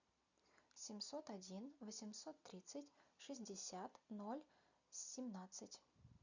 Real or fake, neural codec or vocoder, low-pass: real; none; 7.2 kHz